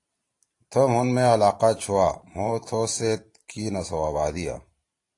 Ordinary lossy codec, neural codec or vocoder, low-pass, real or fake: AAC, 48 kbps; vocoder, 44.1 kHz, 128 mel bands every 512 samples, BigVGAN v2; 10.8 kHz; fake